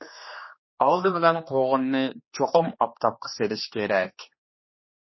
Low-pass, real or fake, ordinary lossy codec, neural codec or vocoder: 7.2 kHz; fake; MP3, 24 kbps; codec, 16 kHz, 2 kbps, X-Codec, HuBERT features, trained on general audio